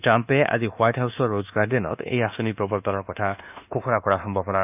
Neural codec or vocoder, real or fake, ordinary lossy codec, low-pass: codec, 24 kHz, 1.2 kbps, DualCodec; fake; none; 3.6 kHz